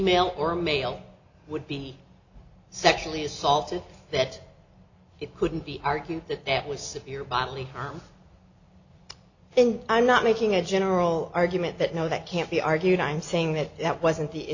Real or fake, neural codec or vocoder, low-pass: real; none; 7.2 kHz